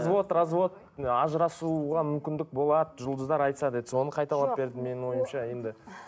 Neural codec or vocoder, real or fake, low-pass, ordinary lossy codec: none; real; none; none